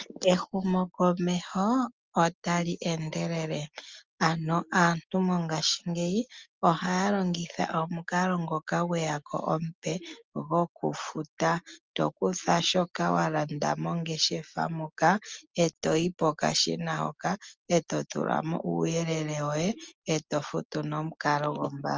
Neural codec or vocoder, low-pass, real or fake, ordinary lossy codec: none; 7.2 kHz; real; Opus, 24 kbps